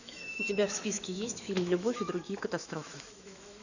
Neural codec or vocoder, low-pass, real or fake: autoencoder, 48 kHz, 128 numbers a frame, DAC-VAE, trained on Japanese speech; 7.2 kHz; fake